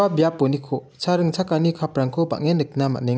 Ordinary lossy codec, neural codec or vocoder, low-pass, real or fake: none; none; none; real